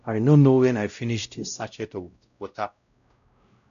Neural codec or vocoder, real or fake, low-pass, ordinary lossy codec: codec, 16 kHz, 0.5 kbps, X-Codec, WavLM features, trained on Multilingual LibriSpeech; fake; 7.2 kHz; none